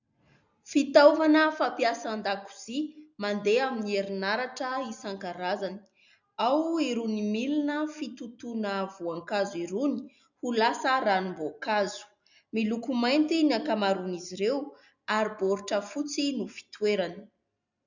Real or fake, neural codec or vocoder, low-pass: real; none; 7.2 kHz